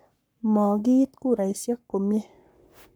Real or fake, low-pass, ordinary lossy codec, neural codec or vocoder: fake; none; none; codec, 44.1 kHz, 7.8 kbps, Pupu-Codec